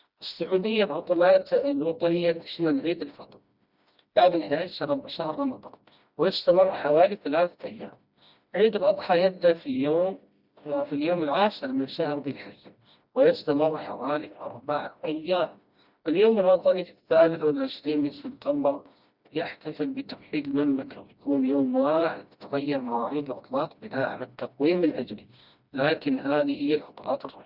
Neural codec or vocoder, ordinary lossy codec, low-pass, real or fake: codec, 16 kHz, 1 kbps, FreqCodec, smaller model; Opus, 64 kbps; 5.4 kHz; fake